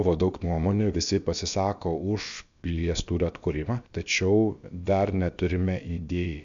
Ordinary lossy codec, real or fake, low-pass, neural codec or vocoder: MP3, 64 kbps; fake; 7.2 kHz; codec, 16 kHz, about 1 kbps, DyCAST, with the encoder's durations